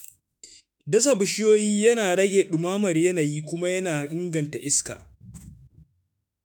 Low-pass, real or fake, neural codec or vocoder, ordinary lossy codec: none; fake; autoencoder, 48 kHz, 32 numbers a frame, DAC-VAE, trained on Japanese speech; none